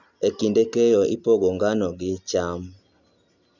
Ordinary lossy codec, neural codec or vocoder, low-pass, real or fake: none; none; 7.2 kHz; real